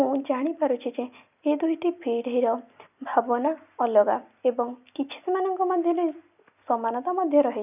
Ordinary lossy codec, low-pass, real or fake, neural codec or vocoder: none; 3.6 kHz; real; none